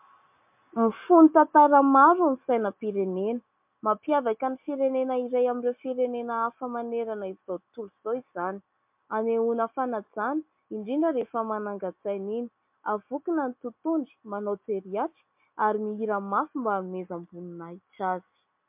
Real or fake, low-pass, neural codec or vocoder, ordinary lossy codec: real; 3.6 kHz; none; MP3, 32 kbps